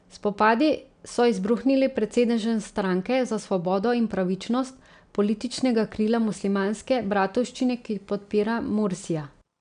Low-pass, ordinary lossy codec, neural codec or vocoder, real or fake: 9.9 kHz; none; none; real